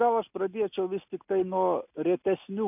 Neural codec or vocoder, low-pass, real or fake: none; 3.6 kHz; real